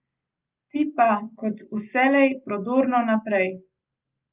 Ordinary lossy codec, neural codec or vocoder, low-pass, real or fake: Opus, 24 kbps; none; 3.6 kHz; real